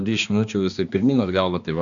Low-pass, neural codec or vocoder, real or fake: 7.2 kHz; codec, 16 kHz, 2 kbps, X-Codec, HuBERT features, trained on balanced general audio; fake